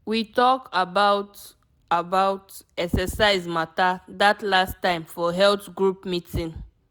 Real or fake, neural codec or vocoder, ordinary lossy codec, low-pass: real; none; none; none